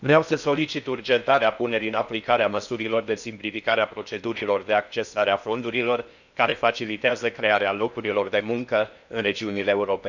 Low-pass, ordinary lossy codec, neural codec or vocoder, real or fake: 7.2 kHz; none; codec, 16 kHz in and 24 kHz out, 0.8 kbps, FocalCodec, streaming, 65536 codes; fake